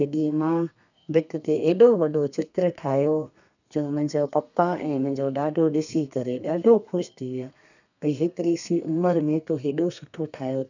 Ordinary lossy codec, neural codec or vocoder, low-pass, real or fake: none; codec, 32 kHz, 1.9 kbps, SNAC; 7.2 kHz; fake